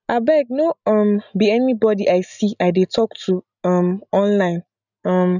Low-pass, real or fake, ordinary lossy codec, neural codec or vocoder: 7.2 kHz; real; none; none